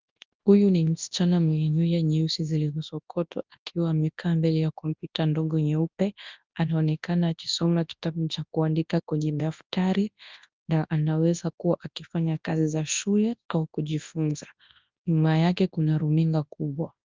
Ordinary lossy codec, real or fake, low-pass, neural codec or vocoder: Opus, 24 kbps; fake; 7.2 kHz; codec, 24 kHz, 0.9 kbps, WavTokenizer, large speech release